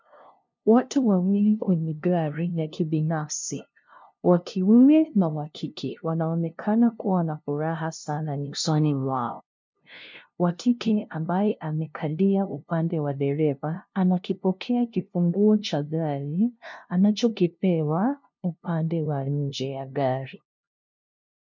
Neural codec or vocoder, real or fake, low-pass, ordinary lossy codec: codec, 16 kHz, 0.5 kbps, FunCodec, trained on LibriTTS, 25 frames a second; fake; 7.2 kHz; MP3, 64 kbps